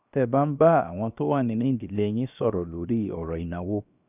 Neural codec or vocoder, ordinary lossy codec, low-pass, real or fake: codec, 16 kHz, 0.7 kbps, FocalCodec; none; 3.6 kHz; fake